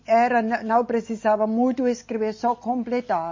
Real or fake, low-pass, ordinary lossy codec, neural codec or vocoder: real; 7.2 kHz; MP3, 32 kbps; none